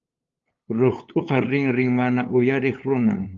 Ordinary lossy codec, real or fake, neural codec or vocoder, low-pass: Opus, 32 kbps; fake; codec, 16 kHz, 8 kbps, FunCodec, trained on LibriTTS, 25 frames a second; 7.2 kHz